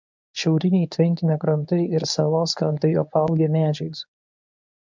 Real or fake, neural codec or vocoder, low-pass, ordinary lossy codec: fake; codec, 24 kHz, 0.9 kbps, WavTokenizer, medium speech release version 1; 7.2 kHz; MP3, 64 kbps